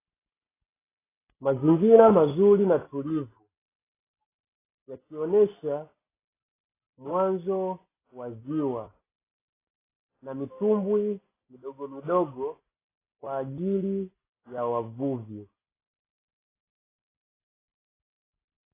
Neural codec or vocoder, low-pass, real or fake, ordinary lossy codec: none; 3.6 kHz; real; AAC, 16 kbps